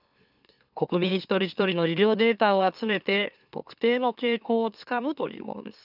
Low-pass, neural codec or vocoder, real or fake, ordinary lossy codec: 5.4 kHz; autoencoder, 44.1 kHz, a latent of 192 numbers a frame, MeloTTS; fake; none